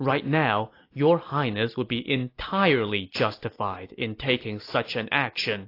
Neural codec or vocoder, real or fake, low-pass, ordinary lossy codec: none; real; 5.4 kHz; AAC, 32 kbps